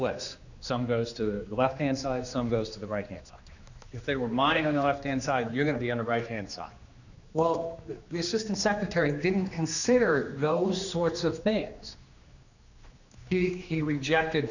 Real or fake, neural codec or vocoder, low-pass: fake; codec, 16 kHz, 2 kbps, X-Codec, HuBERT features, trained on general audio; 7.2 kHz